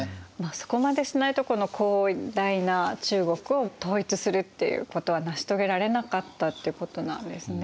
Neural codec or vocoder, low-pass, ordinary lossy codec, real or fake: none; none; none; real